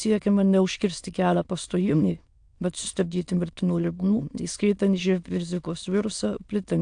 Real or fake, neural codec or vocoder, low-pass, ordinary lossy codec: fake; autoencoder, 22.05 kHz, a latent of 192 numbers a frame, VITS, trained on many speakers; 9.9 kHz; AAC, 64 kbps